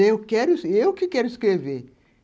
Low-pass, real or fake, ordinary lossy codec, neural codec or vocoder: none; real; none; none